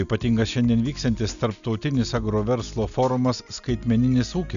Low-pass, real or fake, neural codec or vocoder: 7.2 kHz; real; none